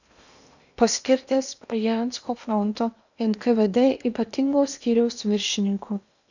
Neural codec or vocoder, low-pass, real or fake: codec, 16 kHz in and 24 kHz out, 0.8 kbps, FocalCodec, streaming, 65536 codes; 7.2 kHz; fake